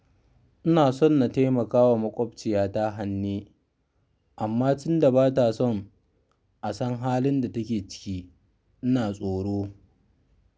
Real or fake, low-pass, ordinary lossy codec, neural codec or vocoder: real; none; none; none